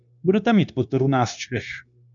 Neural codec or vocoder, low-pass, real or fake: codec, 16 kHz, 0.9 kbps, LongCat-Audio-Codec; 7.2 kHz; fake